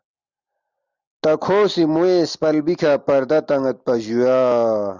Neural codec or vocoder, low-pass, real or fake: none; 7.2 kHz; real